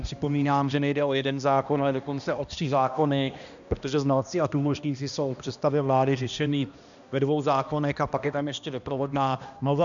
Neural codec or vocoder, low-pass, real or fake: codec, 16 kHz, 1 kbps, X-Codec, HuBERT features, trained on balanced general audio; 7.2 kHz; fake